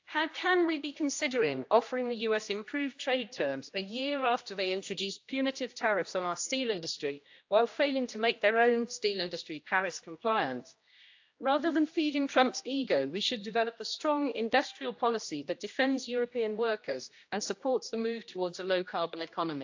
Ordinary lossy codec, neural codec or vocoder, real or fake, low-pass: AAC, 48 kbps; codec, 16 kHz, 1 kbps, X-Codec, HuBERT features, trained on general audio; fake; 7.2 kHz